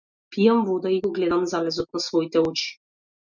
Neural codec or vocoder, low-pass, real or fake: none; 7.2 kHz; real